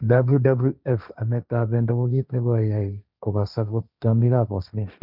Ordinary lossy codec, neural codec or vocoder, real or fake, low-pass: none; codec, 16 kHz, 1.1 kbps, Voila-Tokenizer; fake; 5.4 kHz